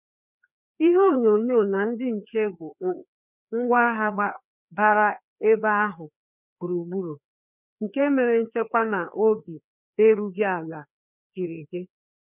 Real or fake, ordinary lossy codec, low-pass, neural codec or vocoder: fake; none; 3.6 kHz; codec, 16 kHz, 2 kbps, FreqCodec, larger model